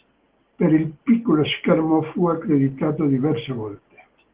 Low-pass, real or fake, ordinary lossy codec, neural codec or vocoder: 3.6 kHz; real; Opus, 16 kbps; none